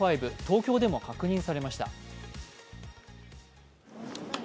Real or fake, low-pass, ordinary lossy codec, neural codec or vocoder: real; none; none; none